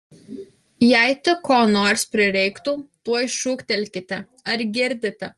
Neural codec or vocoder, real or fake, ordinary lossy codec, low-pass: none; real; Opus, 24 kbps; 10.8 kHz